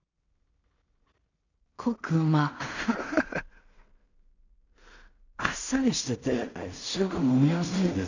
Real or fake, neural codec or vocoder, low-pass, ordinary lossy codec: fake; codec, 16 kHz in and 24 kHz out, 0.4 kbps, LongCat-Audio-Codec, two codebook decoder; 7.2 kHz; none